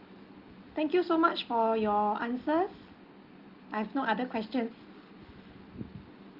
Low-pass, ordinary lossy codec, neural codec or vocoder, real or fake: 5.4 kHz; Opus, 24 kbps; none; real